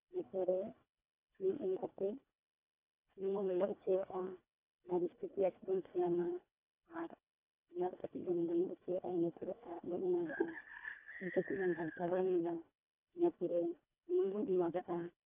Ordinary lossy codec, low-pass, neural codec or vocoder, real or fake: none; 3.6 kHz; codec, 24 kHz, 1.5 kbps, HILCodec; fake